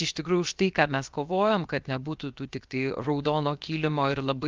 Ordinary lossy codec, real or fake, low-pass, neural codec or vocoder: Opus, 24 kbps; fake; 7.2 kHz; codec, 16 kHz, 0.7 kbps, FocalCodec